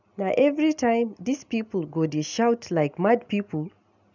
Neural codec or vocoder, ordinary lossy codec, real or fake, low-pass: none; none; real; 7.2 kHz